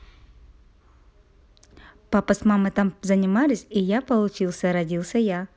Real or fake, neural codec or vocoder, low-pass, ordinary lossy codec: real; none; none; none